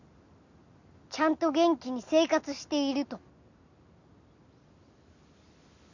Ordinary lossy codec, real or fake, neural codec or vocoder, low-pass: none; real; none; 7.2 kHz